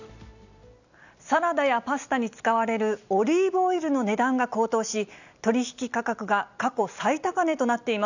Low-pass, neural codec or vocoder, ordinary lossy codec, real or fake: 7.2 kHz; none; none; real